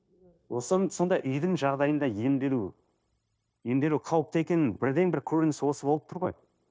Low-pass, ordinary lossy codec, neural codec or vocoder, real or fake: none; none; codec, 16 kHz, 0.9 kbps, LongCat-Audio-Codec; fake